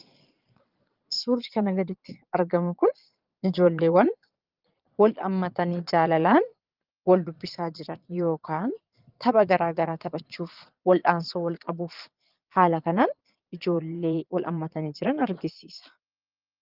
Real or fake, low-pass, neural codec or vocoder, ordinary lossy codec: fake; 5.4 kHz; vocoder, 22.05 kHz, 80 mel bands, Vocos; Opus, 32 kbps